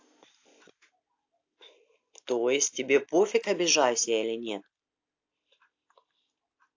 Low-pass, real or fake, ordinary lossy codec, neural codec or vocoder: 7.2 kHz; real; AAC, 48 kbps; none